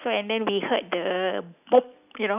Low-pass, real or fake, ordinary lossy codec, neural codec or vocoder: 3.6 kHz; real; none; none